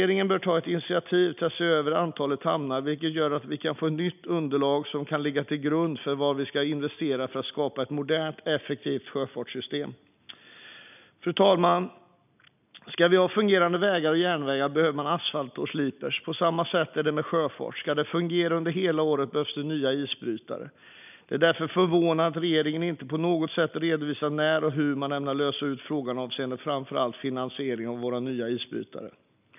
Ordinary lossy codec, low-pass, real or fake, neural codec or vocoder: none; 3.6 kHz; real; none